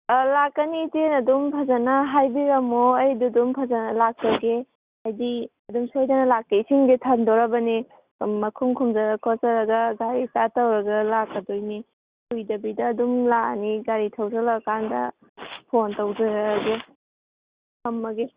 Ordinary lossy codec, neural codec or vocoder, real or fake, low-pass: Opus, 64 kbps; none; real; 3.6 kHz